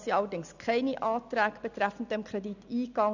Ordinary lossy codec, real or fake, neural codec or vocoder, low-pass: none; real; none; 7.2 kHz